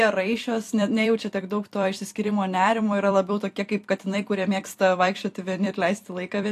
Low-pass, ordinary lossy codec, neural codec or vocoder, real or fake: 14.4 kHz; AAC, 64 kbps; vocoder, 44.1 kHz, 128 mel bands every 256 samples, BigVGAN v2; fake